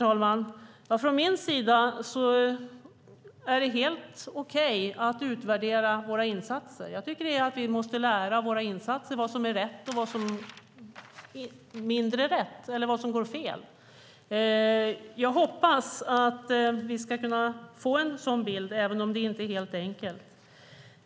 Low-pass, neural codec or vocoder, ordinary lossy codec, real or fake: none; none; none; real